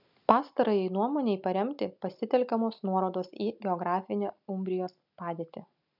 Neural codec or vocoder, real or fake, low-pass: none; real; 5.4 kHz